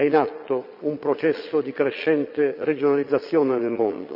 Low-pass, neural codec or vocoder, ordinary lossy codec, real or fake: 5.4 kHz; vocoder, 22.05 kHz, 80 mel bands, Vocos; none; fake